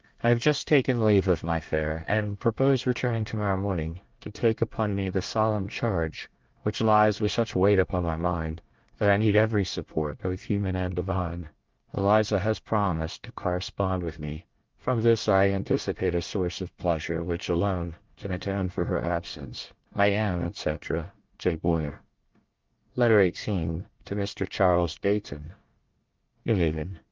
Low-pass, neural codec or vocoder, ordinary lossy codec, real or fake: 7.2 kHz; codec, 24 kHz, 1 kbps, SNAC; Opus, 24 kbps; fake